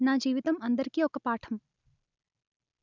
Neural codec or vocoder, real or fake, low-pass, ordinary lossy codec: none; real; 7.2 kHz; none